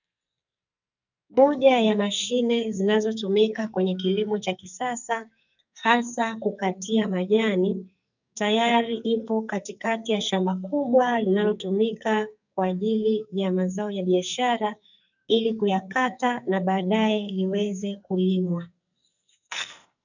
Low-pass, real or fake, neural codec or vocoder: 7.2 kHz; fake; codec, 44.1 kHz, 2.6 kbps, SNAC